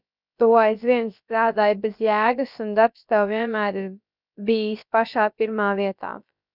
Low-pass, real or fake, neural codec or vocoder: 5.4 kHz; fake; codec, 16 kHz, about 1 kbps, DyCAST, with the encoder's durations